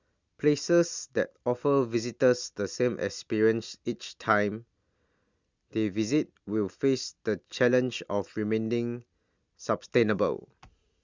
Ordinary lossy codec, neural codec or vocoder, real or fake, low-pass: Opus, 64 kbps; none; real; 7.2 kHz